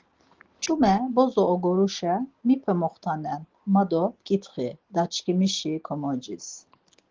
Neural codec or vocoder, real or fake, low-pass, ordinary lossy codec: none; real; 7.2 kHz; Opus, 16 kbps